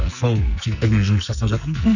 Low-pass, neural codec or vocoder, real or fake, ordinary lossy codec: 7.2 kHz; codec, 44.1 kHz, 2.6 kbps, SNAC; fake; none